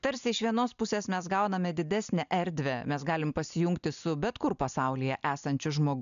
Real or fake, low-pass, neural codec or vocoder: real; 7.2 kHz; none